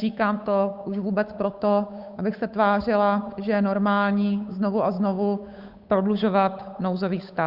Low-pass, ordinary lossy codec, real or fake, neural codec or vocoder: 5.4 kHz; Opus, 64 kbps; fake; codec, 16 kHz, 8 kbps, FunCodec, trained on Chinese and English, 25 frames a second